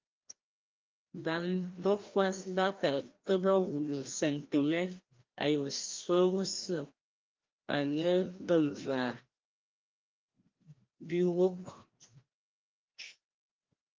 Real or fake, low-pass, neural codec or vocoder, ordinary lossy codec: fake; 7.2 kHz; codec, 16 kHz, 1 kbps, FreqCodec, larger model; Opus, 32 kbps